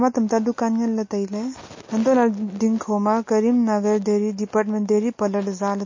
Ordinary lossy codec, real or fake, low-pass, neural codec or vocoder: MP3, 32 kbps; real; 7.2 kHz; none